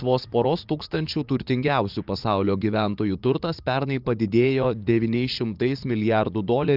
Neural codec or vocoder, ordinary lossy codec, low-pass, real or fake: vocoder, 44.1 kHz, 80 mel bands, Vocos; Opus, 24 kbps; 5.4 kHz; fake